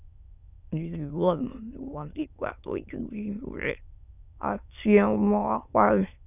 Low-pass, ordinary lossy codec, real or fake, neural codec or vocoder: 3.6 kHz; none; fake; autoencoder, 22.05 kHz, a latent of 192 numbers a frame, VITS, trained on many speakers